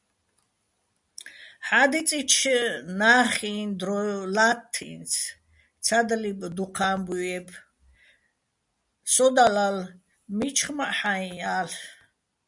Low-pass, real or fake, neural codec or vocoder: 10.8 kHz; real; none